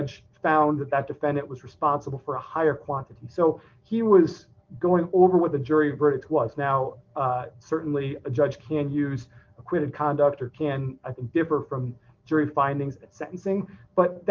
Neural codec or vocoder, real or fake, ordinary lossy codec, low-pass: none; real; Opus, 32 kbps; 7.2 kHz